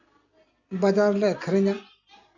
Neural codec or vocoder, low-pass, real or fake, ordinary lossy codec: none; 7.2 kHz; real; none